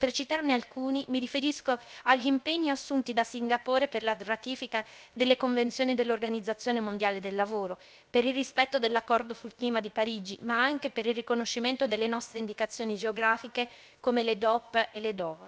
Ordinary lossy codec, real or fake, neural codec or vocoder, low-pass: none; fake; codec, 16 kHz, about 1 kbps, DyCAST, with the encoder's durations; none